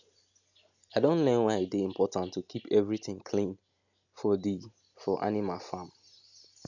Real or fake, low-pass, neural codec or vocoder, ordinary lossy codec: real; 7.2 kHz; none; none